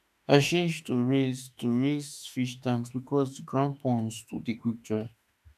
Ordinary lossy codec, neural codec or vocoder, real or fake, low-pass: none; autoencoder, 48 kHz, 32 numbers a frame, DAC-VAE, trained on Japanese speech; fake; 14.4 kHz